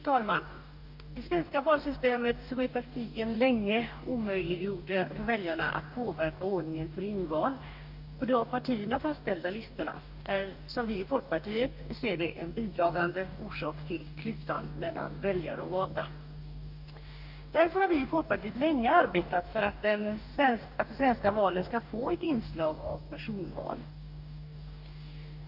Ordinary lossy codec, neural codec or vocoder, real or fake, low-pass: none; codec, 44.1 kHz, 2.6 kbps, DAC; fake; 5.4 kHz